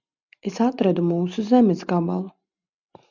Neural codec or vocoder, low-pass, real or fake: none; 7.2 kHz; real